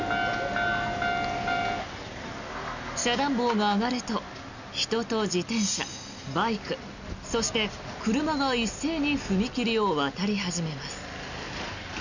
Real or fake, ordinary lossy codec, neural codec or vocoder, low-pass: real; none; none; 7.2 kHz